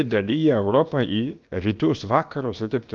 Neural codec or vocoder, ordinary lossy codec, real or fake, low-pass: codec, 16 kHz, about 1 kbps, DyCAST, with the encoder's durations; Opus, 32 kbps; fake; 7.2 kHz